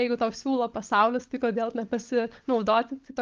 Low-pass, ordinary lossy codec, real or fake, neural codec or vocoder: 7.2 kHz; Opus, 32 kbps; fake; codec, 16 kHz, 16 kbps, FunCodec, trained on LibriTTS, 50 frames a second